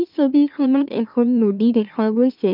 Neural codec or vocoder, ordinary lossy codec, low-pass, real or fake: autoencoder, 44.1 kHz, a latent of 192 numbers a frame, MeloTTS; none; 5.4 kHz; fake